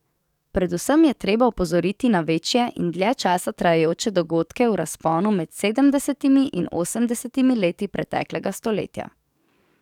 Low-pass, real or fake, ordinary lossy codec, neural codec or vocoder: 19.8 kHz; fake; none; codec, 44.1 kHz, 7.8 kbps, DAC